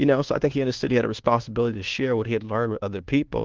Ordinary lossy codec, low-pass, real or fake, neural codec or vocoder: Opus, 16 kbps; 7.2 kHz; fake; codec, 24 kHz, 1.2 kbps, DualCodec